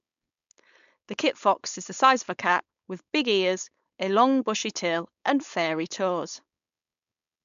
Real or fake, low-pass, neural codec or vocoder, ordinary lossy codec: fake; 7.2 kHz; codec, 16 kHz, 4.8 kbps, FACodec; MP3, 64 kbps